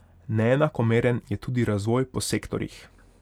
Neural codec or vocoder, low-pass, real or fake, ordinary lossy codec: none; 19.8 kHz; real; none